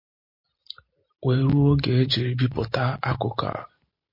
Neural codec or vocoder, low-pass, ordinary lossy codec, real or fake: none; 5.4 kHz; MP3, 32 kbps; real